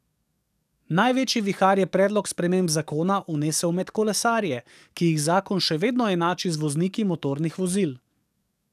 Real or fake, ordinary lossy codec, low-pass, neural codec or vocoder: fake; none; 14.4 kHz; codec, 44.1 kHz, 7.8 kbps, DAC